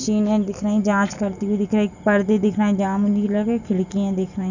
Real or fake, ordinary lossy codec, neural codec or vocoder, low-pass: real; none; none; 7.2 kHz